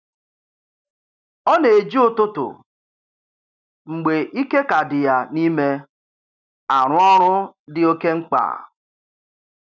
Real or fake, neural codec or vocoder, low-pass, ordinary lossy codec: real; none; 7.2 kHz; none